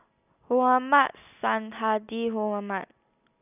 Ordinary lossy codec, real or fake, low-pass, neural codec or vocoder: none; real; 3.6 kHz; none